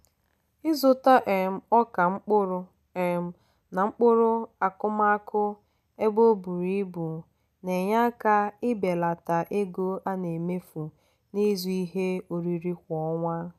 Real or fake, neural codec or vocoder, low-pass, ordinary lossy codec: real; none; 14.4 kHz; none